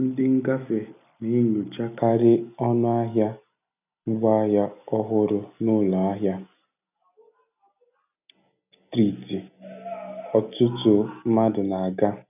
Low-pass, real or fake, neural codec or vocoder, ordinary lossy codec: 3.6 kHz; real; none; none